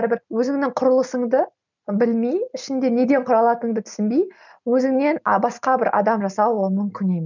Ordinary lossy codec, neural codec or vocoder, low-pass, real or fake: none; none; 7.2 kHz; real